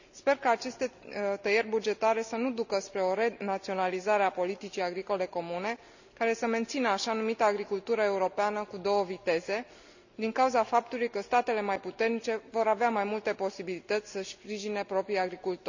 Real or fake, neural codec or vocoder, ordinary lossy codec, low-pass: real; none; none; 7.2 kHz